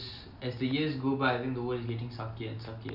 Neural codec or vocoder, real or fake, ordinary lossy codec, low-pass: none; real; none; 5.4 kHz